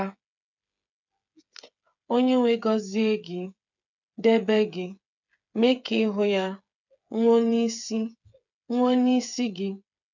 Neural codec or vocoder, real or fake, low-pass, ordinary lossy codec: codec, 16 kHz, 8 kbps, FreqCodec, smaller model; fake; 7.2 kHz; none